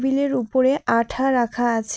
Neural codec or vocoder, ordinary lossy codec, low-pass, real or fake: none; none; none; real